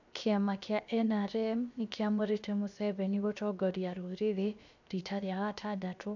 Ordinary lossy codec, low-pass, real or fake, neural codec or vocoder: none; 7.2 kHz; fake; codec, 16 kHz, 0.7 kbps, FocalCodec